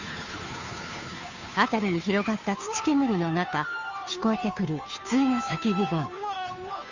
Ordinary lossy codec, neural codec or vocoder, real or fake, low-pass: Opus, 64 kbps; codec, 16 kHz, 2 kbps, FunCodec, trained on Chinese and English, 25 frames a second; fake; 7.2 kHz